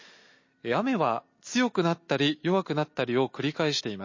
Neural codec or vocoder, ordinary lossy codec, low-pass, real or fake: none; MP3, 32 kbps; 7.2 kHz; real